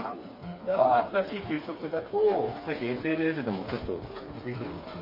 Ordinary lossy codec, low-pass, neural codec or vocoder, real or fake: MP3, 24 kbps; 5.4 kHz; codec, 16 kHz, 4 kbps, FreqCodec, smaller model; fake